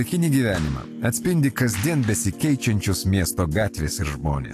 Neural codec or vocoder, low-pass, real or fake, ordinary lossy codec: none; 14.4 kHz; real; AAC, 64 kbps